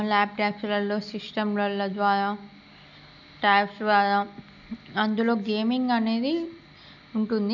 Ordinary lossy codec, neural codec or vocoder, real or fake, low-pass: none; none; real; 7.2 kHz